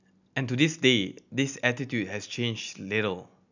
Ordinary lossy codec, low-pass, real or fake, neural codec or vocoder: none; 7.2 kHz; real; none